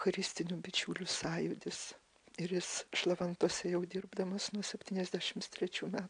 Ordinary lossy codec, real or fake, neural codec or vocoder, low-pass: AAC, 64 kbps; real; none; 9.9 kHz